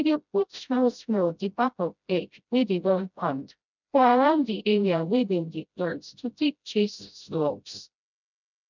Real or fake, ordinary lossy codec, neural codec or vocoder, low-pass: fake; none; codec, 16 kHz, 0.5 kbps, FreqCodec, smaller model; 7.2 kHz